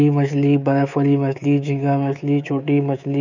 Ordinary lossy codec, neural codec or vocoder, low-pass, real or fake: none; codec, 24 kHz, 3.1 kbps, DualCodec; 7.2 kHz; fake